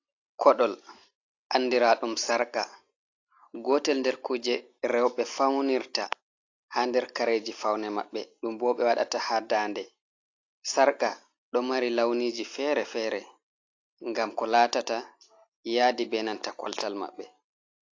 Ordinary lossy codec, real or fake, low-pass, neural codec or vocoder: AAC, 48 kbps; real; 7.2 kHz; none